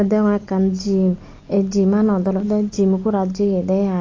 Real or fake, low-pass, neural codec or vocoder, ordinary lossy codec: real; 7.2 kHz; none; AAC, 32 kbps